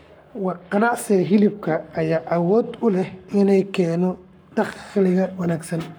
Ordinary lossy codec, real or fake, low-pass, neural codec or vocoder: none; fake; none; codec, 44.1 kHz, 7.8 kbps, Pupu-Codec